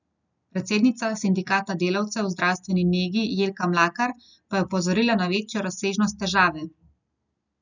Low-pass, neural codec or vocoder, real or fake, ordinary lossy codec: 7.2 kHz; none; real; none